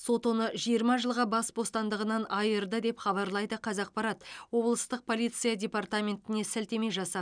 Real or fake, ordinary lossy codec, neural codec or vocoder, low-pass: real; none; none; 9.9 kHz